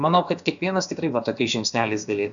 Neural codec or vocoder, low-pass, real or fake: codec, 16 kHz, about 1 kbps, DyCAST, with the encoder's durations; 7.2 kHz; fake